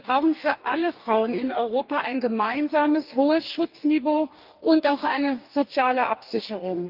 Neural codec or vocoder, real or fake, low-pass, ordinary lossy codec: codec, 44.1 kHz, 2.6 kbps, DAC; fake; 5.4 kHz; Opus, 32 kbps